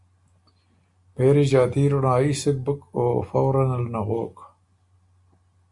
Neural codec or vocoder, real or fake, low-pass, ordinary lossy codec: none; real; 10.8 kHz; AAC, 64 kbps